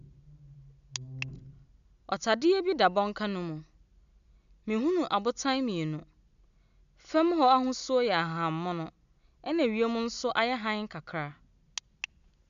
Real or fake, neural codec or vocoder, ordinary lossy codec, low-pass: real; none; none; 7.2 kHz